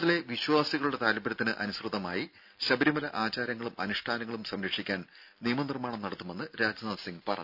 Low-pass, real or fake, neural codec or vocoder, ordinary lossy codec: 5.4 kHz; real; none; none